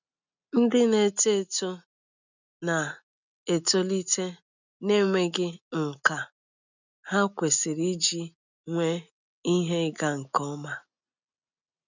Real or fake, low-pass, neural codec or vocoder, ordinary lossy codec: real; 7.2 kHz; none; none